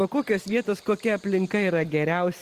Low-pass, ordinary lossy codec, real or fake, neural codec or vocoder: 14.4 kHz; Opus, 24 kbps; real; none